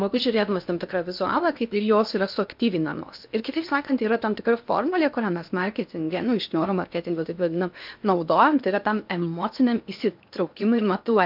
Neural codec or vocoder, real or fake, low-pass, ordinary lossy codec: codec, 16 kHz in and 24 kHz out, 0.8 kbps, FocalCodec, streaming, 65536 codes; fake; 5.4 kHz; MP3, 48 kbps